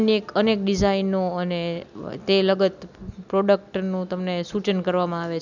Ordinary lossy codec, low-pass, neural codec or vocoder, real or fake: none; 7.2 kHz; none; real